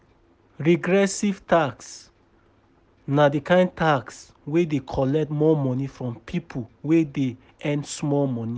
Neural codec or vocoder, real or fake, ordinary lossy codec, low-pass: none; real; none; none